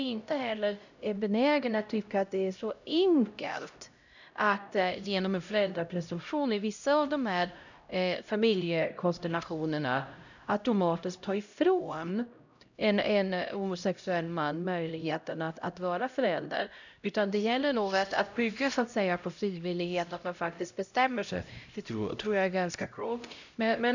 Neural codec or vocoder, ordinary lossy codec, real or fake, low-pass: codec, 16 kHz, 0.5 kbps, X-Codec, HuBERT features, trained on LibriSpeech; none; fake; 7.2 kHz